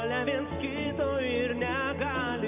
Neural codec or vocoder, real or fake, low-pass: none; real; 3.6 kHz